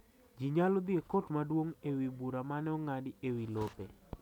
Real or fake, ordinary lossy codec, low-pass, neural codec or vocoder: real; none; 19.8 kHz; none